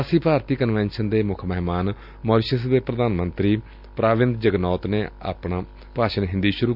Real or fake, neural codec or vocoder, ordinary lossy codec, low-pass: real; none; none; 5.4 kHz